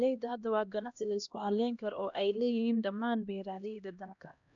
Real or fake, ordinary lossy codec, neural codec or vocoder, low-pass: fake; none; codec, 16 kHz, 1 kbps, X-Codec, HuBERT features, trained on LibriSpeech; 7.2 kHz